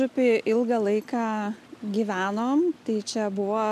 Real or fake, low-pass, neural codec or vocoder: real; 14.4 kHz; none